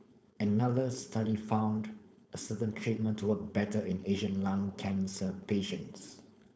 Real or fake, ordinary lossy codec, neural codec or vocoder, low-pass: fake; none; codec, 16 kHz, 4.8 kbps, FACodec; none